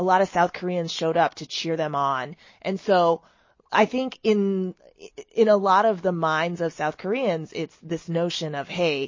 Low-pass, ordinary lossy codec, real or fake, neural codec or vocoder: 7.2 kHz; MP3, 32 kbps; real; none